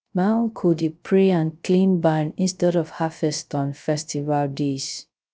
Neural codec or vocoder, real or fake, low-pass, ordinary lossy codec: codec, 16 kHz, 0.3 kbps, FocalCodec; fake; none; none